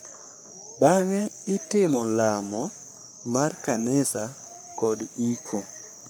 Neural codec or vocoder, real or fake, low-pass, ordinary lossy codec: codec, 44.1 kHz, 3.4 kbps, Pupu-Codec; fake; none; none